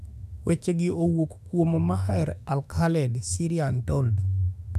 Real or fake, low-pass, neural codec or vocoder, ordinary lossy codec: fake; 14.4 kHz; autoencoder, 48 kHz, 32 numbers a frame, DAC-VAE, trained on Japanese speech; none